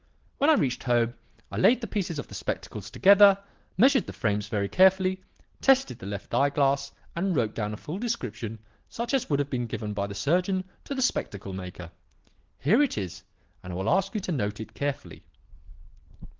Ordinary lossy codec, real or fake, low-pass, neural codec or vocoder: Opus, 16 kbps; real; 7.2 kHz; none